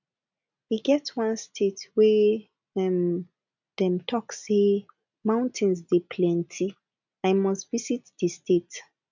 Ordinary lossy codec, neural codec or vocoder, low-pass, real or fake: none; none; 7.2 kHz; real